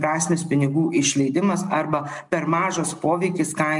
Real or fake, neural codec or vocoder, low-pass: real; none; 10.8 kHz